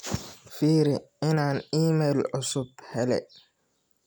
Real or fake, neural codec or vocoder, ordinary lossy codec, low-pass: real; none; none; none